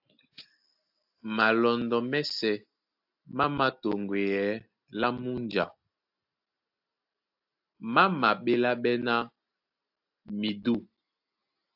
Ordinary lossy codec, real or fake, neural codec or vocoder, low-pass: AAC, 48 kbps; real; none; 5.4 kHz